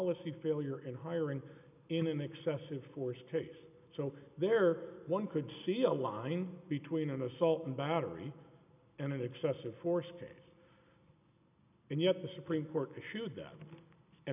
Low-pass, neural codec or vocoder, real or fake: 3.6 kHz; none; real